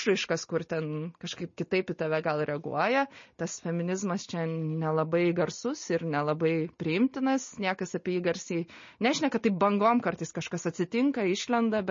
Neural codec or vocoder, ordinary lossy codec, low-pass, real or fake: none; MP3, 32 kbps; 7.2 kHz; real